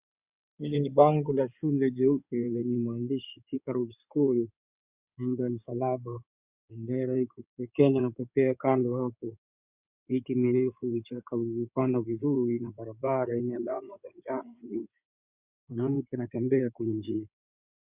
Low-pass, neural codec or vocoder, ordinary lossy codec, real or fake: 3.6 kHz; codec, 16 kHz in and 24 kHz out, 2.2 kbps, FireRedTTS-2 codec; Opus, 64 kbps; fake